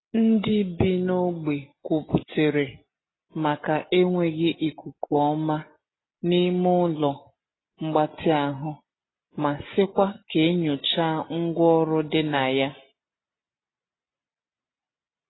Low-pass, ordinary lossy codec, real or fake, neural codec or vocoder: 7.2 kHz; AAC, 16 kbps; real; none